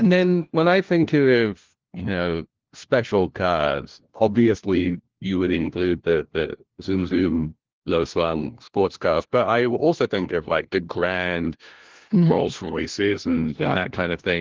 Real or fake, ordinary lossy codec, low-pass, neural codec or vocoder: fake; Opus, 16 kbps; 7.2 kHz; codec, 16 kHz, 1 kbps, FunCodec, trained on LibriTTS, 50 frames a second